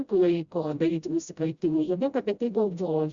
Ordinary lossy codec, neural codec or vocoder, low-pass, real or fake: Opus, 64 kbps; codec, 16 kHz, 0.5 kbps, FreqCodec, smaller model; 7.2 kHz; fake